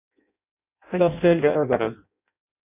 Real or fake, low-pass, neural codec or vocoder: fake; 3.6 kHz; codec, 16 kHz in and 24 kHz out, 0.6 kbps, FireRedTTS-2 codec